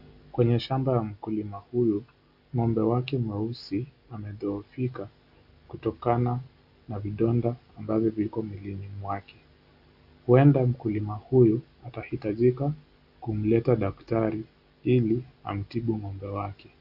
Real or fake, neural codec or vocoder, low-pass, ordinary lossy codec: real; none; 5.4 kHz; AAC, 48 kbps